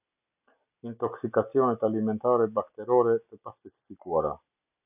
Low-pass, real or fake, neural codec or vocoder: 3.6 kHz; real; none